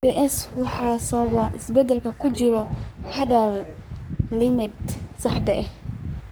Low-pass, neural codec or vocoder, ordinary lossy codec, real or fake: none; codec, 44.1 kHz, 3.4 kbps, Pupu-Codec; none; fake